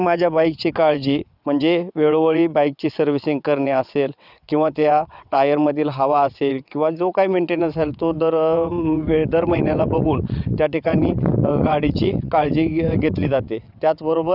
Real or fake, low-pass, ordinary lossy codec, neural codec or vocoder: fake; 5.4 kHz; none; vocoder, 22.05 kHz, 80 mel bands, WaveNeXt